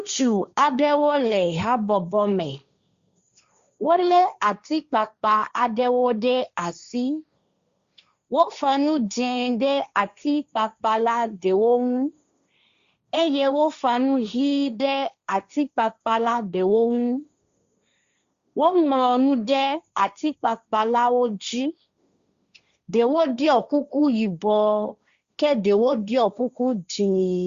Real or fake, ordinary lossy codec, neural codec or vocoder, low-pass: fake; Opus, 64 kbps; codec, 16 kHz, 1.1 kbps, Voila-Tokenizer; 7.2 kHz